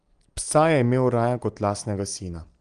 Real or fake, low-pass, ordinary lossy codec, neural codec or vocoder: real; 9.9 kHz; Opus, 24 kbps; none